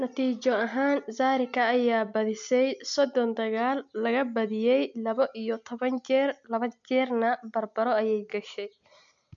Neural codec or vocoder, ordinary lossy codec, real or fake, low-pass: none; MP3, 64 kbps; real; 7.2 kHz